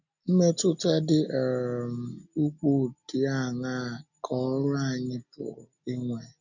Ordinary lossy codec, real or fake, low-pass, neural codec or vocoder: none; real; 7.2 kHz; none